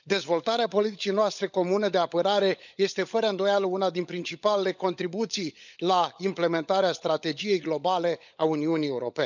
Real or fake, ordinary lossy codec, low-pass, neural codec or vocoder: fake; none; 7.2 kHz; codec, 16 kHz, 16 kbps, FunCodec, trained on LibriTTS, 50 frames a second